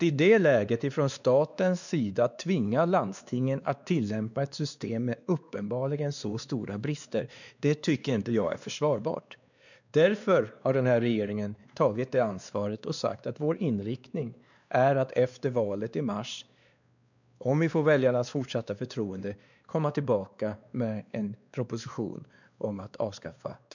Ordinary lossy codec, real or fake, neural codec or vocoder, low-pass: none; fake; codec, 16 kHz, 2 kbps, X-Codec, WavLM features, trained on Multilingual LibriSpeech; 7.2 kHz